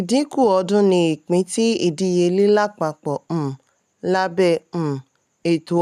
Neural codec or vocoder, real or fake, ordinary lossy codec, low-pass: none; real; none; 14.4 kHz